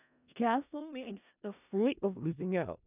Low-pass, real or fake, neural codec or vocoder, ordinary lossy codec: 3.6 kHz; fake; codec, 16 kHz in and 24 kHz out, 0.4 kbps, LongCat-Audio-Codec, four codebook decoder; none